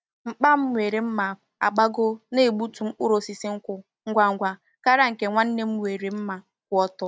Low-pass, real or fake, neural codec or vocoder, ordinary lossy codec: none; real; none; none